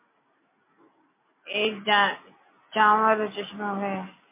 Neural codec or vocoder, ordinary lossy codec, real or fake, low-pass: none; MP3, 24 kbps; real; 3.6 kHz